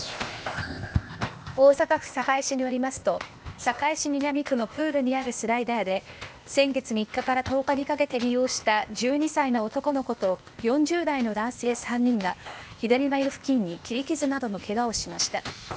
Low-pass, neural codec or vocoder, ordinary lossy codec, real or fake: none; codec, 16 kHz, 0.8 kbps, ZipCodec; none; fake